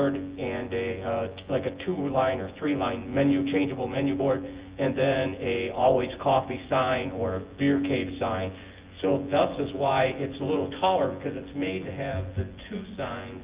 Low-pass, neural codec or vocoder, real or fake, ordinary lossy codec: 3.6 kHz; vocoder, 24 kHz, 100 mel bands, Vocos; fake; Opus, 32 kbps